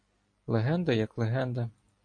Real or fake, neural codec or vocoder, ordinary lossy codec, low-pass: real; none; MP3, 48 kbps; 9.9 kHz